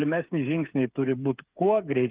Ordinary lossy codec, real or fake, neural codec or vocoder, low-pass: Opus, 32 kbps; fake; codec, 16 kHz, 8 kbps, FreqCodec, smaller model; 3.6 kHz